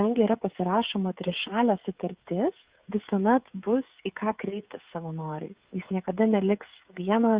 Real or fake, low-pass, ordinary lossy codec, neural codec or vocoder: fake; 3.6 kHz; Opus, 64 kbps; codec, 16 kHz, 16 kbps, FreqCodec, smaller model